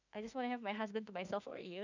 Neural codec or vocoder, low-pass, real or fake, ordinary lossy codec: autoencoder, 48 kHz, 32 numbers a frame, DAC-VAE, trained on Japanese speech; 7.2 kHz; fake; none